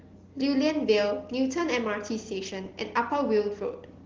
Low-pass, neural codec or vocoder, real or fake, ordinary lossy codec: 7.2 kHz; none; real; Opus, 16 kbps